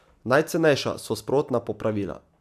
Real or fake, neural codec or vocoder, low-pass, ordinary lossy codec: real; none; 14.4 kHz; AAC, 96 kbps